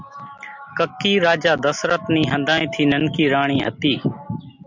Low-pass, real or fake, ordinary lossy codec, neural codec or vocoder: 7.2 kHz; real; MP3, 64 kbps; none